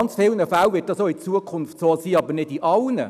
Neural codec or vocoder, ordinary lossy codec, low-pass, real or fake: none; none; 14.4 kHz; real